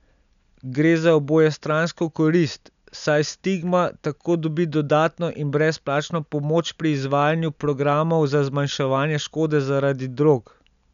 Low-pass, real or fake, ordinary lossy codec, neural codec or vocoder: 7.2 kHz; real; none; none